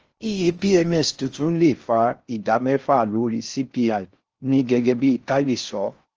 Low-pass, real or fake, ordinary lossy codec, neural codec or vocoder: 7.2 kHz; fake; Opus, 24 kbps; codec, 16 kHz in and 24 kHz out, 0.6 kbps, FocalCodec, streaming, 4096 codes